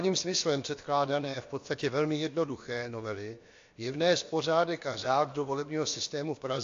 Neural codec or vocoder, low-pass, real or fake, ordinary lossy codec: codec, 16 kHz, about 1 kbps, DyCAST, with the encoder's durations; 7.2 kHz; fake; AAC, 48 kbps